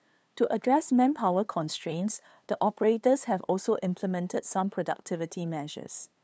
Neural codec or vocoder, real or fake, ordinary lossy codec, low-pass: codec, 16 kHz, 2 kbps, FunCodec, trained on LibriTTS, 25 frames a second; fake; none; none